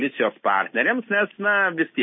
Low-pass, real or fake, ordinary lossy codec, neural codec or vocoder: 7.2 kHz; real; MP3, 24 kbps; none